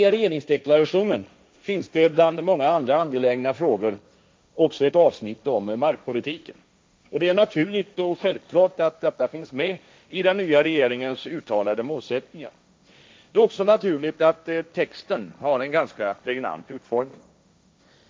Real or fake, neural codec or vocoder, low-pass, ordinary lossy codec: fake; codec, 16 kHz, 1.1 kbps, Voila-Tokenizer; none; none